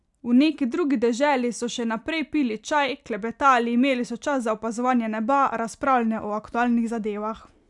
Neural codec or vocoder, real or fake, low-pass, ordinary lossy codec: none; real; 10.8 kHz; none